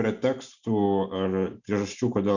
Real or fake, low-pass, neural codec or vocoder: real; 7.2 kHz; none